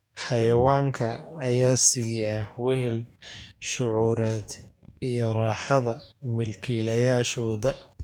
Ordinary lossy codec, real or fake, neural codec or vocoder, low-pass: none; fake; codec, 44.1 kHz, 2.6 kbps, DAC; 19.8 kHz